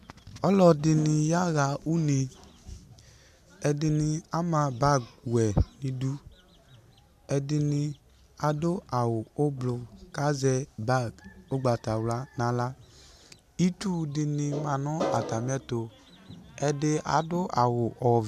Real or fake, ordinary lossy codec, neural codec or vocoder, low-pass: real; AAC, 96 kbps; none; 14.4 kHz